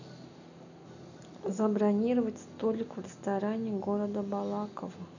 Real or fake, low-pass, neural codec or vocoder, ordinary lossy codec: real; 7.2 kHz; none; none